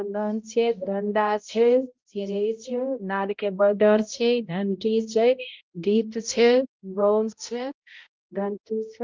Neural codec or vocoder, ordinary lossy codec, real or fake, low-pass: codec, 16 kHz, 0.5 kbps, X-Codec, HuBERT features, trained on balanced general audio; Opus, 32 kbps; fake; 7.2 kHz